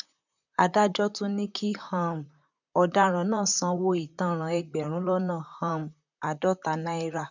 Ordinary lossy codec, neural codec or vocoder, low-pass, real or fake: none; vocoder, 44.1 kHz, 128 mel bands, Pupu-Vocoder; 7.2 kHz; fake